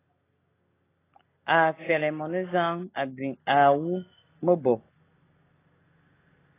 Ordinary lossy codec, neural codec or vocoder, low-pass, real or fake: AAC, 16 kbps; none; 3.6 kHz; real